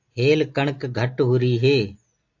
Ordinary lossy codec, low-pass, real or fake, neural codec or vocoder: AAC, 48 kbps; 7.2 kHz; real; none